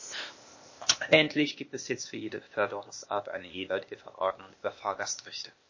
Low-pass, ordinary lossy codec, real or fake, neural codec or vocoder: 7.2 kHz; MP3, 32 kbps; fake; codec, 16 kHz, 0.8 kbps, ZipCodec